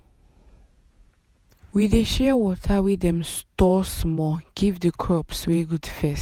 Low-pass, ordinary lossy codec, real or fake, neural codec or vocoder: 19.8 kHz; none; fake; vocoder, 48 kHz, 128 mel bands, Vocos